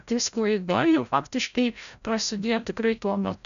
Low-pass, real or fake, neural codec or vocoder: 7.2 kHz; fake; codec, 16 kHz, 0.5 kbps, FreqCodec, larger model